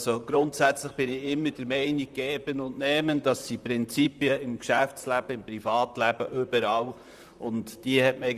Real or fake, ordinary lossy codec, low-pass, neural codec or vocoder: fake; Opus, 64 kbps; 14.4 kHz; vocoder, 44.1 kHz, 128 mel bands, Pupu-Vocoder